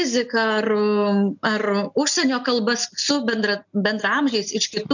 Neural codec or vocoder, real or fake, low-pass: none; real; 7.2 kHz